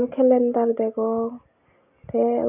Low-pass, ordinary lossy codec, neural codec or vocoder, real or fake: 3.6 kHz; none; none; real